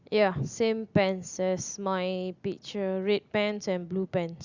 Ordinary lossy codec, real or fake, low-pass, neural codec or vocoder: Opus, 64 kbps; real; 7.2 kHz; none